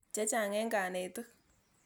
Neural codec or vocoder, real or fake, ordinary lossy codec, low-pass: none; real; none; none